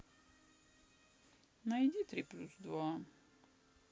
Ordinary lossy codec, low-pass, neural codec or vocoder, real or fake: none; none; none; real